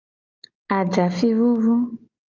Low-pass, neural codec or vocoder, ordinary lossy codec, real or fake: 7.2 kHz; none; Opus, 32 kbps; real